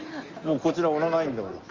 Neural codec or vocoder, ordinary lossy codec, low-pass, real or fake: none; Opus, 32 kbps; 7.2 kHz; real